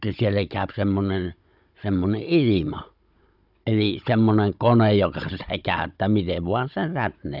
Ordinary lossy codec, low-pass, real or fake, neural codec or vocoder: none; 5.4 kHz; fake; codec, 16 kHz, 16 kbps, FunCodec, trained on Chinese and English, 50 frames a second